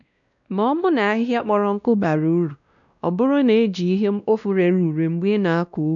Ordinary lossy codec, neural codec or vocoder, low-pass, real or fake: none; codec, 16 kHz, 1 kbps, X-Codec, WavLM features, trained on Multilingual LibriSpeech; 7.2 kHz; fake